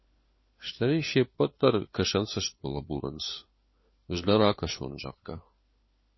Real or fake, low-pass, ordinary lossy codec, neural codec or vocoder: fake; 7.2 kHz; MP3, 24 kbps; codec, 16 kHz, 4 kbps, FunCodec, trained on LibriTTS, 50 frames a second